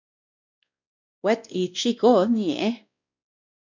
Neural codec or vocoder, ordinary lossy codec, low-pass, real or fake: codec, 16 kHz, 1 kbps, X-Codec, WavLM features, trained on Multilingual LibriSpeech; MP3, 64 kbps; 7.2 kHz; fake